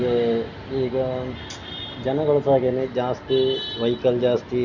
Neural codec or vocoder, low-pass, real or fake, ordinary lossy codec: none; 7.2 kHz; real; none